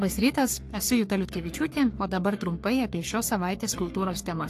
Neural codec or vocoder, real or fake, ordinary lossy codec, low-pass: codec, 44.1 kHz, 3.4 kbps, Pupu-Codec; fake; AAC, 64 kbps; 14.4 kHz